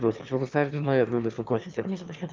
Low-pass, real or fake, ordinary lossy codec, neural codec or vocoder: 7.2 kHz; fake; Opus, 24 kbps; autoencoder, 22.05 kHz, a latent of 192 numbers a frame, VITS, trained on one speaker